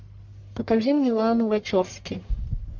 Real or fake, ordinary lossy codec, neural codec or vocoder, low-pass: fake; MP3, 64 kbps; codec, 44.1 kHz, 1.7 kbps, Pupu-Codec; 7.2 kHz